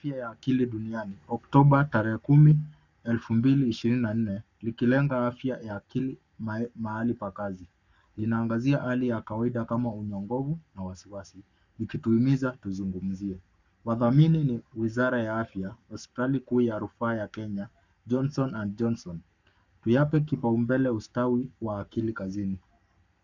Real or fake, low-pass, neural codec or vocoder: fake; 7.2 kHz; codec, 44.1 kHz, 7.8 kbps, Pupu-Codec